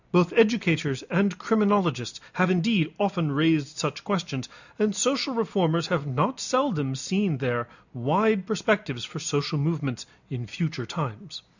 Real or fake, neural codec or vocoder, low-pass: real; none; 7.2 kHz